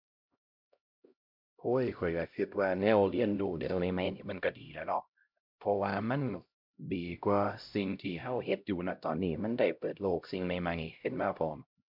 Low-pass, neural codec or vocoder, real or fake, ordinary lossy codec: 5.4 kHz; codec, 16 kHz, 0.5 kbps, X-Codec, HuBERT features, trained on LibriSpeech; fake; MP3, 48 kbps